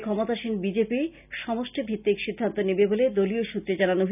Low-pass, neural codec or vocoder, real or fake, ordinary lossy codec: 3.6 kHz; none; real; none